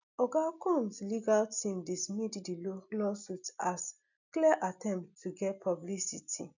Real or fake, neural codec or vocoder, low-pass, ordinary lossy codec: real; none; 7.2 kHz; none